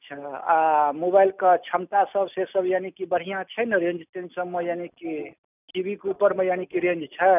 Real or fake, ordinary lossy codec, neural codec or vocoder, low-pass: real; none; none; 3.6 kHz